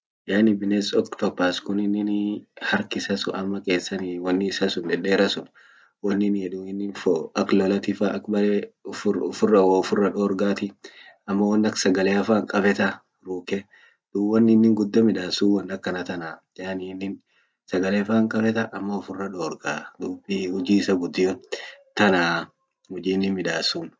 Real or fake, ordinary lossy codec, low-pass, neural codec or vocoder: real; none; none; none